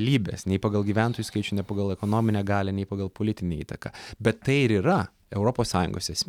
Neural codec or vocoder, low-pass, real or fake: none; 19.8 kHz; real